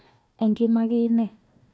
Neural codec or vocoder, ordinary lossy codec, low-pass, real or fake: codec, 16 kHz, 1 kbps, FunCodec, trained on Chinese and English, 50 frames a second; none; none; fake